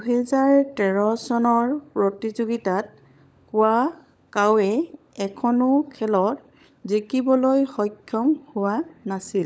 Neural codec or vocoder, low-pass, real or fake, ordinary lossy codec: codec, 16 kHz, 16 kbps, FunCodec, trained on LibriTTS, 50 frames a second; none; fake; none